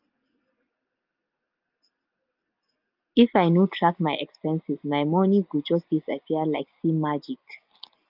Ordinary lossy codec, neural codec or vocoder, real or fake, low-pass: Opus, 24 kbps; none; real; 5.4 kHz